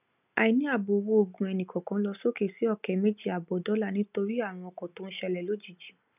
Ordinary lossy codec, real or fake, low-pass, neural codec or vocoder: none; real; 3.6 kHz; none